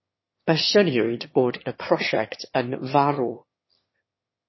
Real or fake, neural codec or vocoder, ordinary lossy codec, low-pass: fake; autoencoder, 22.05 kHz, a latent of 192 numbers a frame, VITS, trained on one speaker; MP3, 24 kbps; 7.2 kHz